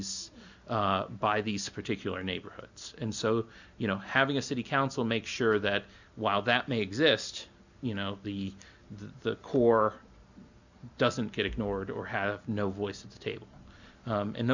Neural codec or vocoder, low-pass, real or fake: none; 7.2 kHz; real